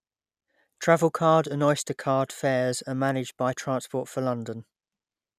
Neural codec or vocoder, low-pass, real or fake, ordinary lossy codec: none; 14.4 kHz; real; none